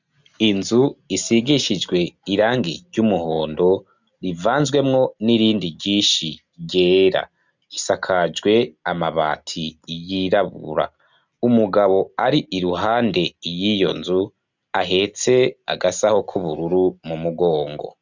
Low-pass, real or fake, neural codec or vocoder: 7.2 kHz; real; none